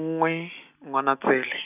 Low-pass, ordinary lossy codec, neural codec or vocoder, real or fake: 3.6 kHz; none; none; real